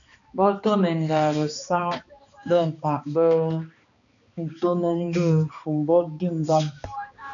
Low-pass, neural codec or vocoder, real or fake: 7.2 kHz; codec, 16 kHz, 2 kbps, X-Codec, HuBERT features, trained on balanced general audio; fake